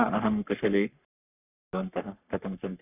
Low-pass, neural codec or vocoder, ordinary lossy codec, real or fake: 3.6 kHz; codec, 44.1 kHz, 3.4 kbps, Pupu-Codec; none; fake